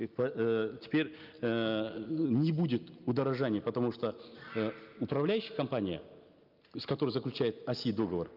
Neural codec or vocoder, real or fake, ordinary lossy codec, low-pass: none; real; Opus, 24 kbps; 5.4 kHz